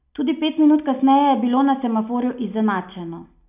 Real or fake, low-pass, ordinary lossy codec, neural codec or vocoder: real; 3.6 kHz; none; none